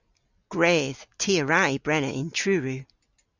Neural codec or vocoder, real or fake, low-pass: none; real; 7.2 kHz